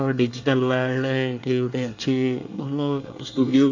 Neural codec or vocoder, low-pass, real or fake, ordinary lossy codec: codec, 24 kHz, 1 kbps, SNAC; 7.2 kHz; fake; AAC, 48 kbps